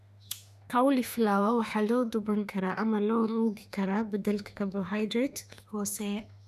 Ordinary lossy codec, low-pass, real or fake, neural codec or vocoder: none; 14.4 kHz; fake; autoencoder, 48 kHz, 32 numbers a frame, DAC-VAE, trained on Japanese speech